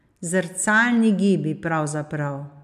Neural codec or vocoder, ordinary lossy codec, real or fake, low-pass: none; none; real; 14.4 kHz